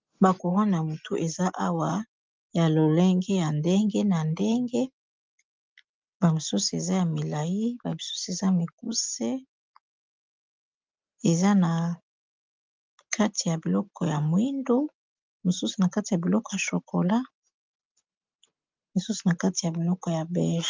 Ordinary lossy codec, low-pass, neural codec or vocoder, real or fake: Opus, 24 kbps; 7.2 kHz; none; real